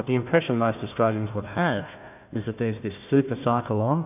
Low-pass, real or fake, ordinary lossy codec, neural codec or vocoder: 3.6 kHz; fake; AAC, 32 kbps; codec, 16 kHz, 1 kbps, FunCodec, trained on Chinese and English, 50 frames a second